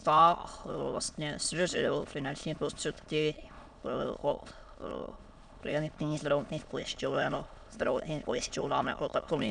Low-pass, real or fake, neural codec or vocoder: 9.9 kHz; fake; autoencoder, 22.05 kHz, a latent of 192 numbers a frame, VITS, trained on many speakers